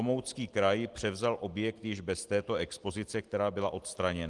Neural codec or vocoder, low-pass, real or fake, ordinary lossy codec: none; 10.8 kHz; real; Opus, 24 kbps